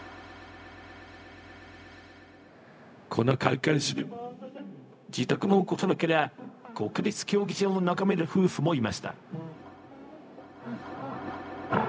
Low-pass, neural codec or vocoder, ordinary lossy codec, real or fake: none; codec, 16 kHz, 0.4 kbps, LongCat-Audio-Codec; none; fake